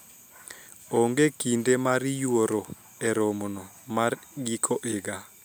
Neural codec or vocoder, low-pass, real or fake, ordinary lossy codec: none; none; real; none